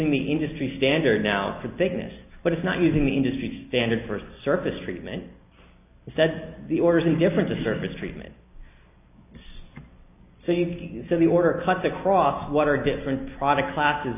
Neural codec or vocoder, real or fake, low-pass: none; real; 3.6 kHz